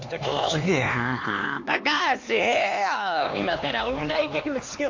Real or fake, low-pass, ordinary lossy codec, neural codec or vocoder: fake; 7.2 kHz; AAC, 48 kbps; codec, 16 kHz, 2 kbps, X-Codec, HuBERT features, trained on LibriSpeech